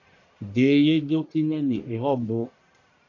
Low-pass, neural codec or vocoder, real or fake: 7.2 kHz; codec, 44.1 kHz, 1.7 kbps, Pupu-Codec; fake